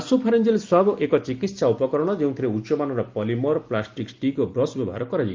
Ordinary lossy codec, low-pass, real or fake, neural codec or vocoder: Opus, 32 kbps; 7.2 kHz; real; none